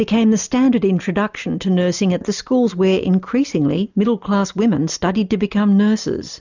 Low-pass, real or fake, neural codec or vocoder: 7.2 kHz; real; none